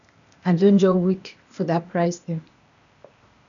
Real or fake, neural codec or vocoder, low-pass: fake; codec, 16 kHz, 0.8 kbps, ZipCodec; 7.2 kHz